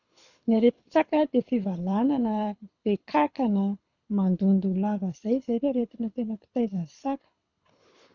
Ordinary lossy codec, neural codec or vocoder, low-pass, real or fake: none; codec, 24 kHz, 6 kbps, HILCodec; 7.2 kHz; fake